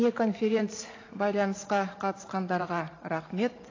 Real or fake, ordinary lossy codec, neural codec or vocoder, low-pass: fake; AAC, 32 kbps; vocoder, 44.1 kHz, 128 mel bands every 512 samples, BigVGAN v2; 7.2 kHz